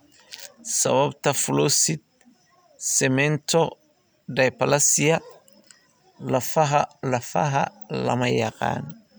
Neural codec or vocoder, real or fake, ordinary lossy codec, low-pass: none; real; none; none